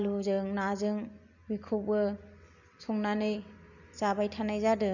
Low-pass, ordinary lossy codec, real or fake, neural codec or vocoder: 7.2 kHz; none; real; none